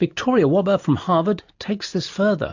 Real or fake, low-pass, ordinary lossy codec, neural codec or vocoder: real; 7.2 kHz; AAC, 48 kbps; none